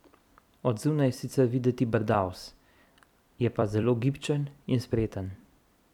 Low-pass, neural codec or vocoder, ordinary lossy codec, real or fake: 19.8 kHz; vocoder, 44.1 kHz, 128 mel bands every 256 samples, BigVGAN v2; none; fake